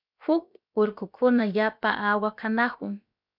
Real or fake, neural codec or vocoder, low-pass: fake; codec, 16 kHz, about 1 kbps, DyCAST, with the encoder's durations; 5.4 kHz